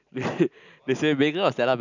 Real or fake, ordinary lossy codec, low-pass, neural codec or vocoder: real; none; 7.2 kHz; none